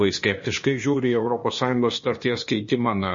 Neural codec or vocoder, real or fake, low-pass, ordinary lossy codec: codec, 16 kHz, 0.8 kbps, ZipCodec; fake; 7.2 kHz; MP3, 32 kbps